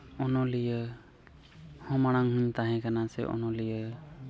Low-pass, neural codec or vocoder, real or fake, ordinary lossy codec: none; none; real; none